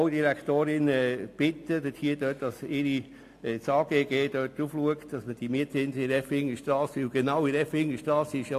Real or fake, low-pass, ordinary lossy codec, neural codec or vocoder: real; 14.4 kHz; AAC, 64 kbps; none